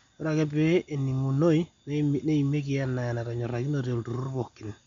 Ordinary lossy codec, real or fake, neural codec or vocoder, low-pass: MP3, 64 kbps; real; none; 7.2 kHz